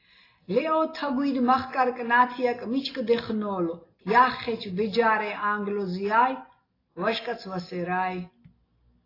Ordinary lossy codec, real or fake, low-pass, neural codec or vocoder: AAC, 24 kbps; real; 5.4 kHz; none